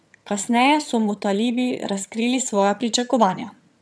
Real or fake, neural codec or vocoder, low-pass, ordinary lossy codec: fake; vocoder, 22.05 kHz, 80 mel bands, HiFi-GAN; none; none